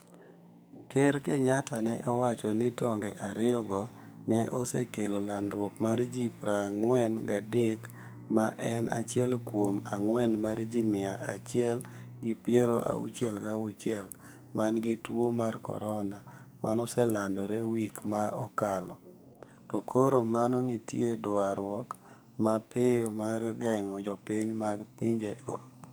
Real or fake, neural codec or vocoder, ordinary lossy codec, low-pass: fake; codec, 44.1 kHz, 2.6 kbps, SNAC; none; none